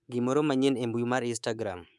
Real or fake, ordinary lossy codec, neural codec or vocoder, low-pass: fake; none; codec, 24 kHz, 3.1 kbps, DualCodec; none